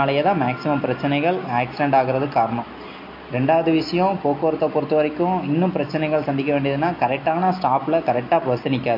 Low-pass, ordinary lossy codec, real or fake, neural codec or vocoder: 5.4 kHz; MP3, 32 kbps; real; none